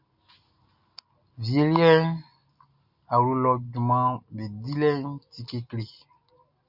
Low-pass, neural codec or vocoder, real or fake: 5.4 kHz; none; real